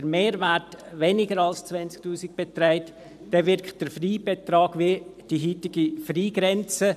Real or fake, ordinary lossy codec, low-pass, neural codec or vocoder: fake; none; 14.4 kHz; vocoder, 44.1 kHz, 128 mel bands every 512 samples, BigVGAN v2